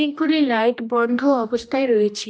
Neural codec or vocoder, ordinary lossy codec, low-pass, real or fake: codec, 16 kHz, 1 kbps, X-Codec, HuBERT features, trained on general audio; none; none; fake